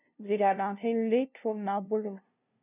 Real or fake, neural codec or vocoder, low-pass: fake; codec, 16 kHz, 0.5 kbps, FunCodec, trained on LibriTTS, 25 frames a second; 3.6 kHz